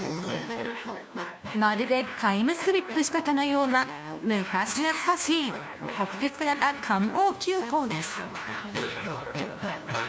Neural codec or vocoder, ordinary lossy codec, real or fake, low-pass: codec, 16 kHz, 1 kbps, FunCodec, trained on LibriTTS, 50 frames a second; none; fake; none